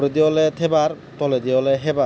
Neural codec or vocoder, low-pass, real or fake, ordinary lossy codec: none; none; real; none